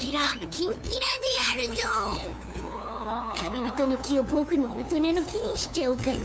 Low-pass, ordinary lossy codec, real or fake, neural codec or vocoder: none; none; fake; codec, 16 kHz, 2 kbps, FunCodec, trained on LibriTTS, 25 frames a second